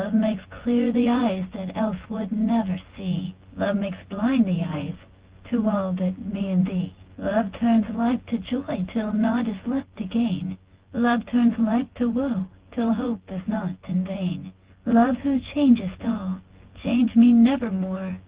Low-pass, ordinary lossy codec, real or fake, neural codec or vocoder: 3.6 kHz; Opus, 16 kbps; fake; vocoder, 24 kHz, 100 mel bands, Vocos